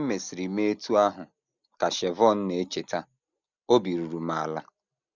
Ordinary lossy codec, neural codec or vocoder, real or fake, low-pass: none; none; real; 7.2 kHz